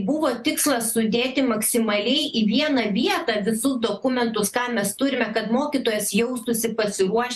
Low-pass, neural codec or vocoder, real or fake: 14.4 kHz; vocoder, 48 kHz, 128 mel bands, Vocos; fake